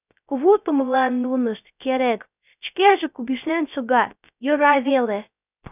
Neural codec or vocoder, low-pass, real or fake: codec, 16 kHz, 0.3 kbps, FocalCodec; 3.6 kHz; fake